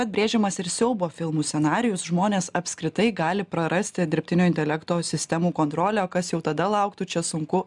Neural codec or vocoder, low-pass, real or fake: none; 10.8 kHz; real